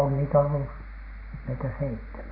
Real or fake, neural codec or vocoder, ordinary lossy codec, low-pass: real; none; none; 5.4 kHz